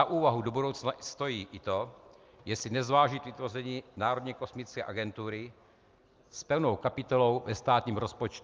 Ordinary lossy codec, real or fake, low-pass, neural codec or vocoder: Opus, 24 kbps; real; 7.2 kHz; none